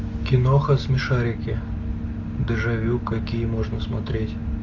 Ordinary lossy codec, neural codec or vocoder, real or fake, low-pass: AAC, 48 kbps; none; real; 7.2 kHz